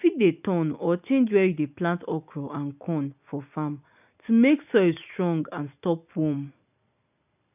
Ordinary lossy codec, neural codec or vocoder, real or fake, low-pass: none; none; real; 3.6 kHz